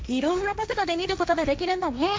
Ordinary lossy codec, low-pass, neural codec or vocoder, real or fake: none; none; codec, 16 kHz, 1.1 kbps, Voila-Tokenizer; fake